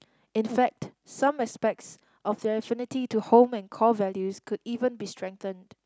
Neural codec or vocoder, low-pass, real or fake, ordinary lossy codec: none; none; real; none